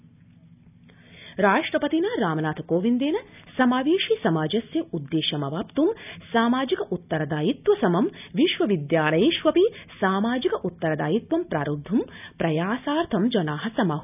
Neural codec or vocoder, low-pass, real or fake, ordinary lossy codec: none; 3.6 kHz; real; none